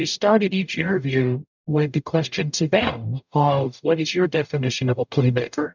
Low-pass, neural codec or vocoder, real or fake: 7.2 kHz; codec, 44.1 kHz, 0.9 kbps, DAC; fake